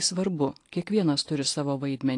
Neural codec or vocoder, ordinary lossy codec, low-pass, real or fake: none; AAC, 48 kbps; 10.8 kHz; real